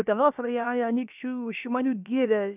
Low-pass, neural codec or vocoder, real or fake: 3.6 kHz; codec, 16 kHz, about 1 kbps, DyCAST, with the encoder's durations; fake